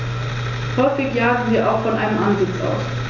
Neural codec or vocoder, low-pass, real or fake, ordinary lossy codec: none; 7.2 kHz; real; none